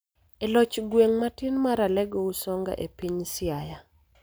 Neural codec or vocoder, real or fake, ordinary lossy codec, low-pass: none; real; none; none